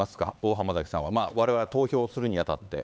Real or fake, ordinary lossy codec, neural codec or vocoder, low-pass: fake; none; codec, 16 kHz, 4 kbps, X-Codec, HuBERT features, trained on LibriSpeech; none